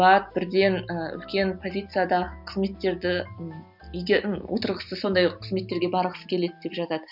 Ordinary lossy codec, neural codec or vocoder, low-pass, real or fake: none; none; 5.4 kHz; real